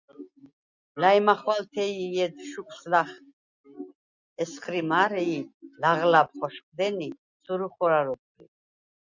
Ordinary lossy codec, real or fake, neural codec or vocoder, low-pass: Opus, 64 kbps; real; none; 7.2 kHz